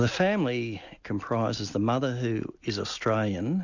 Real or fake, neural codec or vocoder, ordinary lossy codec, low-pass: real; none; Opus, 64 kbps; 7.2 kHz